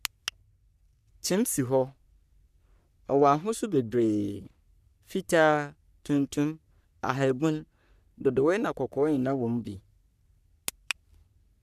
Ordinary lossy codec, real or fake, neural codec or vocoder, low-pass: none; fake; codec, 44.1 kHz, 3.4 kbps, Pupu-Codec; 14.4 kHz